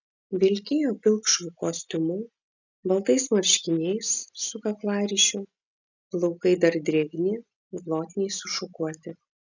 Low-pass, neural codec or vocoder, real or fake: 7.2 kHz; none; real